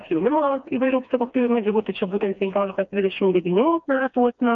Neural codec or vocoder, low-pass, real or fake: codec, 16 kHz, 2 kbps, FreqCodec, smaller model; 7.2 kHz; fake